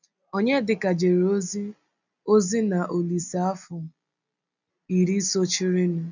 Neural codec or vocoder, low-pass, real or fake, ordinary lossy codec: none; 7.2 kHz; real; none